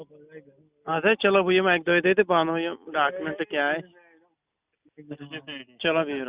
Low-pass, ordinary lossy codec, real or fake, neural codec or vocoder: 3.6 kHz; Opus, 64 kbps; real; none